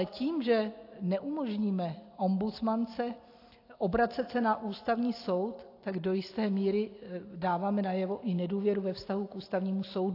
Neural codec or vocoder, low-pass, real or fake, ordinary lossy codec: none; 5.4 kHz; real; AAC, 32 kbps